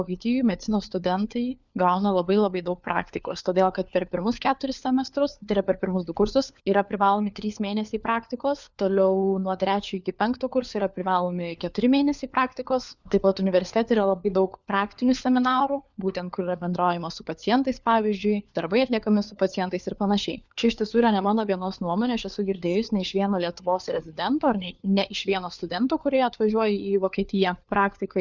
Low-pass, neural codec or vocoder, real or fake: 7.2 kHz; codec, 16 kHz, 4 kbps, FunCodec, trained on LibriTTS, 50 frames a second; fake